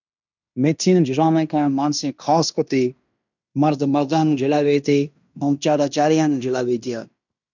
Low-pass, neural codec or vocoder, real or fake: 7.2 kHz; codec, 16 kHz in and 24 kHz out, 0.9 kbps, LongCat-Audio-Codec, fine tuned four codebook decoder; fake